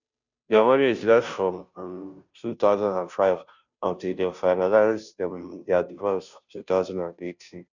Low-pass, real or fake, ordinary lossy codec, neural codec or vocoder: 7.2 kHz; fake; none; codec, 16 kHz, 0.5 kbps, FunCodec, trained on Chinese and English, 25 frames a second